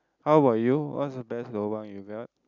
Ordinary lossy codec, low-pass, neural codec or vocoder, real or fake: none; 7.2 kHz; none; real